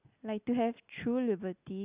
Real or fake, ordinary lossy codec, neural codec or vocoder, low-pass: real; Opus, 64 kbps; none; 3.6 kHz